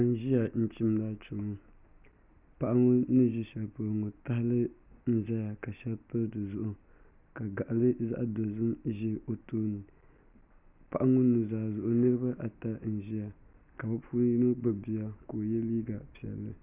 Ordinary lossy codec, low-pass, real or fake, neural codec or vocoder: Opus, 64 kbps; 3.6 kHz; real; none